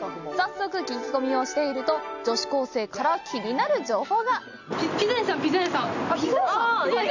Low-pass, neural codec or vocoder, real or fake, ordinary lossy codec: 7.2 kHz; none; real; none